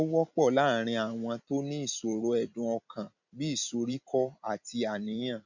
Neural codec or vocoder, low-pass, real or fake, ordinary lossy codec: vocoder, 44.1 kHz, 128 mel bands every 256 samples, BigVGAN v2; 7.2 kHz; fake; none